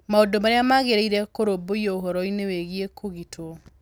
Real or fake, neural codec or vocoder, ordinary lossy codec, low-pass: real; none; none; none